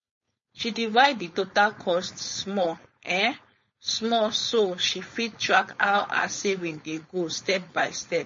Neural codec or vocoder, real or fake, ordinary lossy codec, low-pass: codec, 16 kHz, 4.8 kbps, FACodec; fake; MP3, 32 kbps; 7.2 kHz